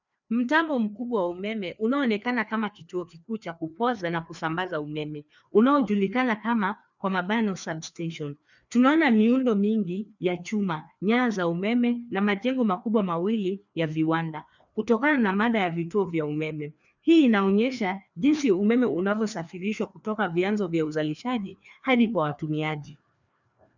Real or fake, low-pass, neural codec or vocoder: fake; 7.2 kHz; codec, 16 kHz, 2 kbps, FreqCodec, larger model